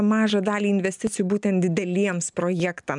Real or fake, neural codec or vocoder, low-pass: real; none; 10.8 kHz